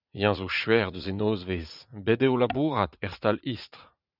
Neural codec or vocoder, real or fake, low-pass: vocoder, 44.1 kHz, 80 mel bands, Vocos; fake; 5.4 kHz